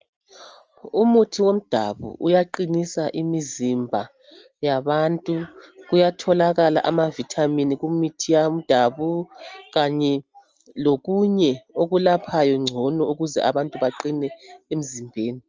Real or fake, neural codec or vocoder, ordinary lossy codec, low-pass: real; none; Opus, 24 kbps; 7.2 kHz